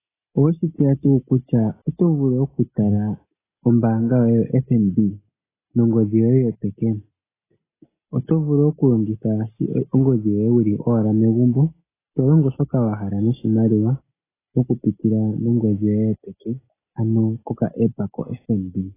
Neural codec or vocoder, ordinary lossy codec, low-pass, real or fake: none; AAC, 16 kbps; 3.6 kHz; real